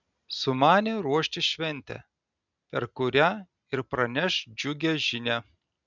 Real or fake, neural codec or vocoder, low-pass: real; none; 7.2 kHz